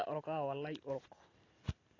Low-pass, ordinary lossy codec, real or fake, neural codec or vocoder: 7.2 kHz; AAC, 32 kbps; real; none